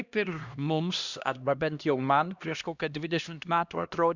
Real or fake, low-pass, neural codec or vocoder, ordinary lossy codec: fake; 7.2 kHz; codec, 16 kHz, 1 kbps, X-Codec, HuBERT features, trained on LibriSpeech; Opus, 64 kbps